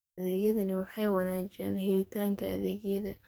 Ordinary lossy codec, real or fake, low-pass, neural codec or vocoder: none; fake; none; codec, 44.1 kHz, 2.6 kbps, SNAC